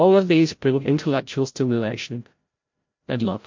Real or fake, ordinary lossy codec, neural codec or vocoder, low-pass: fake; MP3, 48 kbps; codec, 16 kHz, 0.5 kbps, FreqCodec, larger model; 7.2 kHz